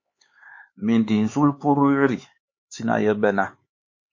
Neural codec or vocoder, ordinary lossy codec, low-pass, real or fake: codec, 16 kHz, 4 kbps, X-Codec, HuBERT features, trained on LibriSpeech; MP3, 32 kbps; 7.2 kHz; fake